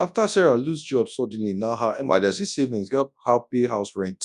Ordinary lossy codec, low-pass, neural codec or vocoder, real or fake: none; 10.8 kHz; codec, 24 kHz, 0.9 kbps, WavTokenizer, large speech release; fake